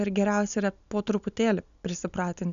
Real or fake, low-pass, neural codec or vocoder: real; 7.2 kHz; none